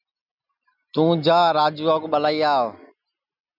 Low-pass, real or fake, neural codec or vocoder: 5.4 kHz; real; none